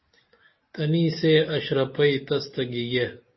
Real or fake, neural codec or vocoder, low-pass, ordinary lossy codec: real; none; 7.2 kHz; MP3, 24 kbps